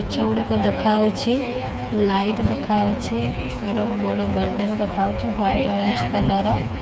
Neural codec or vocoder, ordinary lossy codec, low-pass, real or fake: codec, 16 kHz, 4 kbps, FreqCodec, smaller model; none; none; fake